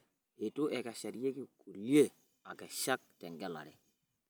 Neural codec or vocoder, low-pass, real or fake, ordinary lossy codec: none; none; real; none